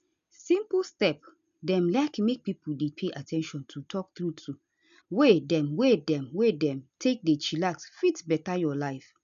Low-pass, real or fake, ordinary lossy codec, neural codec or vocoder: 7.2 kHz; real; none; none